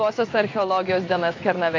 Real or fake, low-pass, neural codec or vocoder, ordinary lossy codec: fake; 7.2 kHz; vocoder, 24 kHz, 100 mel bands, Vocos; AAC, 32 kbps